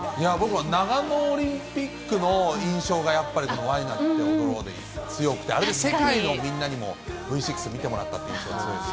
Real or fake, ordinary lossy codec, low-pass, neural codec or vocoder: real; none; none; none